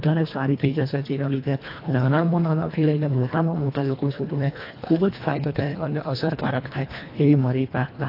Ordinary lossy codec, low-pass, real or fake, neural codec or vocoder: AAC, 32 kbps; 5.4 kHz; fake; codec, 24 kHz, 1.5 kbps, HILCodec